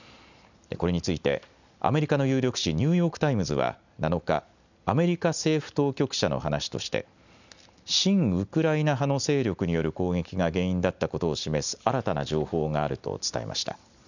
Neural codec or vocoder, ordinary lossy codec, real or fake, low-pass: none; none; real; 7.2 kHz